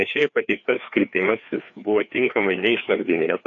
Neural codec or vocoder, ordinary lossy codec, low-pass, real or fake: codec, 16 kHz, 2 kbps, FreqCodec, larger model; AAC, 48 kbps; 7.2 kHz; fake